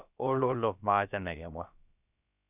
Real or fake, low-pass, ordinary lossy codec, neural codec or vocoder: fake; 3.6 kHz; none; codec, 16 kHz, about 1 kbps, DyCAST, with the encoder's durations